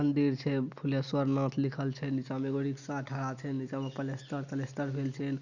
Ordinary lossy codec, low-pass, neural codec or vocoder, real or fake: none; 7.2 kHz; none; real